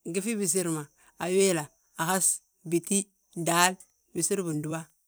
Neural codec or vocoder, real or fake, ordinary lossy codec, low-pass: vocoder, 44.1 kHz, 128 mel bands every 256 samples, BigVGAN v2; fake; none; none